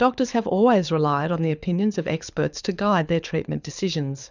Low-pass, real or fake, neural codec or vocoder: 7.2 kHz; fake; codec, 24 kHz, 6 kbps, HILCodec